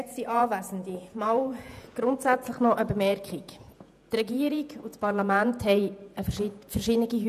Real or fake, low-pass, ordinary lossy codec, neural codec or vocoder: fake; 14.4 kHz; none; vocoder, 48 kHz, 128 mel bands, Vocos